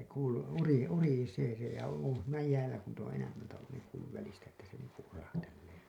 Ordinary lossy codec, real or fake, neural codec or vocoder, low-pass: none; real; none; 19.8 kHz